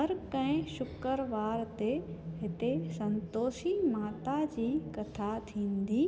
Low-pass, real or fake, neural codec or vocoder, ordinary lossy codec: none; real; none; none